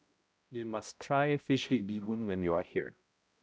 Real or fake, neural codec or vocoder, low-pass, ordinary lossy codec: fake; codec, 16 kHz, 0.5 kbps, X-Codec, HuBERT features, trained on balanced general audio; none; none